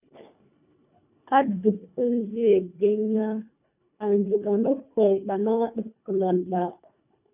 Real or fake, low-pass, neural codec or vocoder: fake; 3.6 kHz; codec, 24 kHz, 1.5 kbps, HILCodec